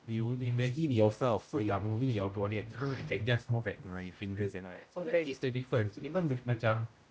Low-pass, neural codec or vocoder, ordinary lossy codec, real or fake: none; codec, 16 kHz, 0.5 kbps, X-Codec, HuBERT features, trained on general audio; none; fake